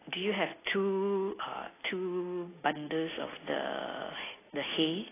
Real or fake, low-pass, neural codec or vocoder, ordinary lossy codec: real; 3.6 kHz; none; AAC, 16 kbps